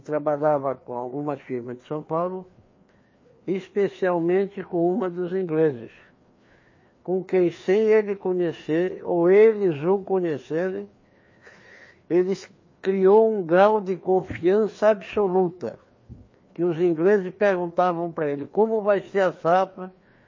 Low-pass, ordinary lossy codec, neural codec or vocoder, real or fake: 7.2 kHz; MP3, 32 kbps; codec, 16 kHz, 2 kbps, FreqCodec, larger model; fake